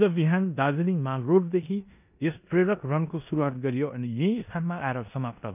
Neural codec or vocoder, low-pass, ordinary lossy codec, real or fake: codec, 16 kHz in and 24 kHz out, 0.9 kbps, LongCat-Audio-Codec, four codebook decoder; 3.6 kHz; none; fake